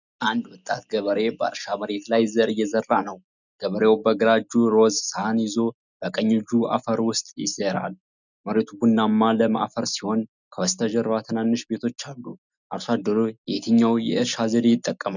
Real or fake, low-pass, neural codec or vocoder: real; 7.2 kHz; none